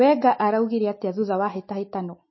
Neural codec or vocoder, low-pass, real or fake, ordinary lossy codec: none; 7.2 kHz; real; MP3, 24 kbps